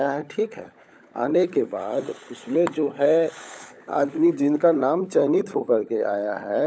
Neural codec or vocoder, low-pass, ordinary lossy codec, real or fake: codec, 16 kHz, 16 kbps, FunCodec, trained on LibriTTS, 50 frames a second; none; none; fake